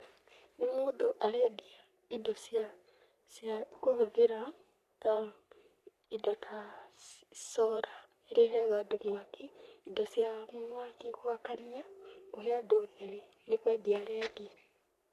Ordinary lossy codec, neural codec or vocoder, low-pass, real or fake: none; codec, 44.1 kHz, 3.4 kbps, Pupu-Codec; 14.4 kHz; fake